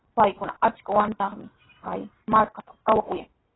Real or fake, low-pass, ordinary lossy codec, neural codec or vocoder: real; 7.2 kHz; AAC, 16 kbps; none